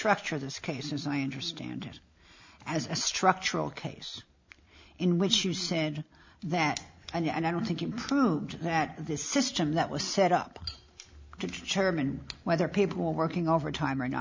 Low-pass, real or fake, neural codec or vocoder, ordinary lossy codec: 7.2 kHz; real; none; MP3, 64 kbps